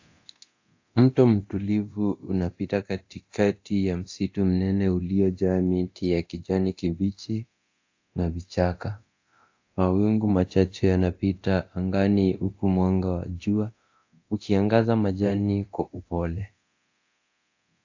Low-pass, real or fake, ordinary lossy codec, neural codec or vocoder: 7.2 kHz; fake; AAC, 48 kbps; codec, 24 kHz, 0.9 kbps, DualCodec